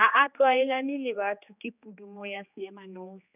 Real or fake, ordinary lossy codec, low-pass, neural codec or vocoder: fake; none; 3.6 kHz; codec, 16 kHz, 2 kbps, X-Codec, HuBERT features, trained on general audio